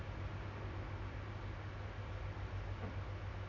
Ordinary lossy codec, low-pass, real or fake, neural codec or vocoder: none; 7.2 kHz; real; none